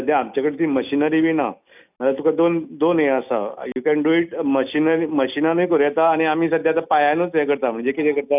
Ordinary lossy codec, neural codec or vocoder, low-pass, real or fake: none; none; 3.6 kHz; real